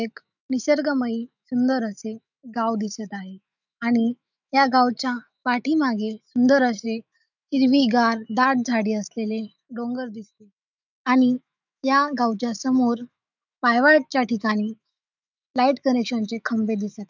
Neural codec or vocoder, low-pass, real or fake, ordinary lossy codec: autoencoder, 48 kHz, 128 numbers a frame, DAC-VAE, trained on Japanese speech; 7.2 kHz; fake; none